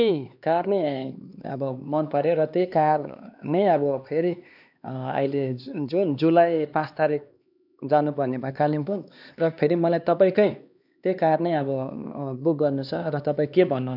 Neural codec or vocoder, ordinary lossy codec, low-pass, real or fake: codec, 16 kHz, 2 kbps, X-Codec, HuBERT features, trained on LibriSpeech; none; 5.4 kHz; fake